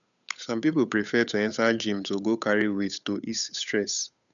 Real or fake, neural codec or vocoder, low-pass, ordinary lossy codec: fake; codec, 16 kHz, 8 kbps, FunCodec, trained on Chinese and English, 25 frames a second; 7.2 kHz; none